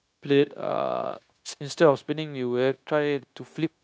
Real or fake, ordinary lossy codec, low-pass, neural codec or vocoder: fake; none; none; codec, 16 kHz, 0.9 kbps, LongCat-Audio-Codec